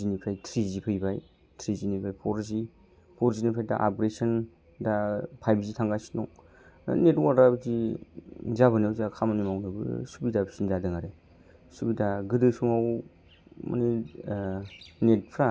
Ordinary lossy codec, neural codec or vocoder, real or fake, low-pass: none; none; real; none